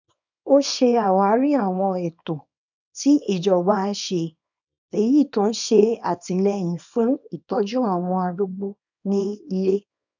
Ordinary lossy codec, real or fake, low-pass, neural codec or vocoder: none; fake; 7.2 kHz; codec, 24 kHz, 0.9 kbps, WavTokenizer, small release